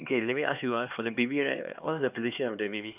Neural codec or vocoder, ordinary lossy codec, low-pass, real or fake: codec, 16 kHz, 2 kbps, X-Codec, HuBERT features, trained on LibriSpeech; none; 3.6 kHz; fake